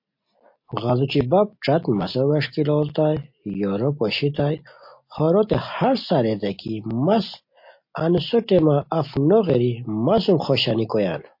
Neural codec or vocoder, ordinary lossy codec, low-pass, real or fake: none; MP3, 32 kbps; 5.4 kHz; real